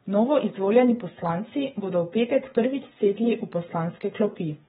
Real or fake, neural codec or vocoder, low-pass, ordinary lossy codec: fake; codec, 16 kHz, 8 kbps, FreqCodec, smaller model; 7.2 kHz; AAC, 16 kbps